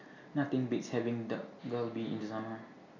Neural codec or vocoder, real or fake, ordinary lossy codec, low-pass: none; real; none; 7.2 kHz